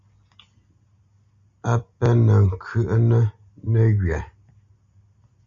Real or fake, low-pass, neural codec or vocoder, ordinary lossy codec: real; 7.2 kHz; none; Opus, 64 kbps